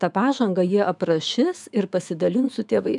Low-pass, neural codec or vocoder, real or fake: 10.8 kHz; autoencoder, 48 kHz, 128 numbers a frame, DAC-VAE, trained on Japanese speech; fake